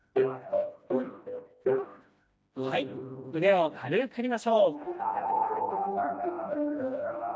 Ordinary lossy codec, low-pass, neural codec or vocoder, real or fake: none; none; codec, 16 kHz, 1 kbps, FreqCodec, smaller model; fake